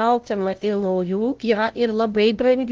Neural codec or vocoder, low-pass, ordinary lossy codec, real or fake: codec, 16 kHz, 0.5 kbps, FunCodec, trained on LibriTTS, 25 frames a second; 7.2 kHz; Opus, 16 kbps; fake